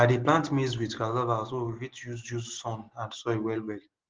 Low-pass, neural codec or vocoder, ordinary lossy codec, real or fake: 7.2 kHz; none; Opus, 16 kbps; real